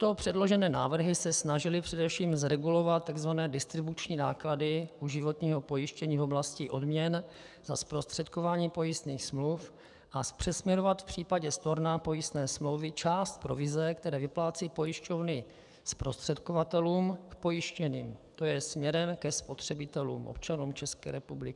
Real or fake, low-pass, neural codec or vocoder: fake; 10.8 kHz; codec, 44.1 kHz, 7.8 kbps, DAC